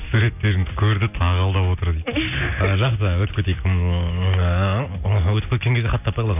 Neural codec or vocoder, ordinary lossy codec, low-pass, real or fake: none; none; 3.6 kHz; real